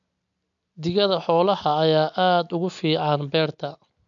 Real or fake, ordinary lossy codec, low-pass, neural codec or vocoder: real; none; 7.2 kHz; none